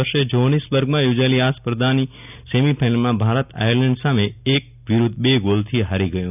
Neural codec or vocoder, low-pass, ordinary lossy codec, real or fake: none; 3.6 kHz; none; real